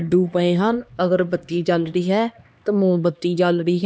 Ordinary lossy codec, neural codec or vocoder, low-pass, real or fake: none; codec, 16 kHz, 2 kbps, X-Codec, HuBERT features, trained on LibriSpeech; none; fake